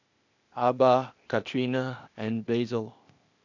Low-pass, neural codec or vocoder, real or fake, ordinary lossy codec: 7.2 kHz; codec, 16 kHz, 0.8 kbps, ZipCodec; fake; none